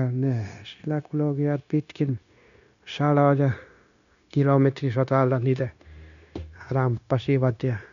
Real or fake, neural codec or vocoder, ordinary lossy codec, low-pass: fake; codec, 16 kHz, 0.9 kbps, LongCat-Audio-Codec; none; 7.2 kHz